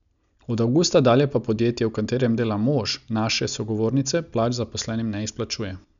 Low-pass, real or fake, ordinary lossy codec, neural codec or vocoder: 7.2 kHz; real; none; none